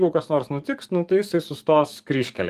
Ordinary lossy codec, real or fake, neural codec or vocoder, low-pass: Opus, 32 kbps; fake; codec, 44.1 kHz, 7.8 kbps, DAC; 14.4 kHz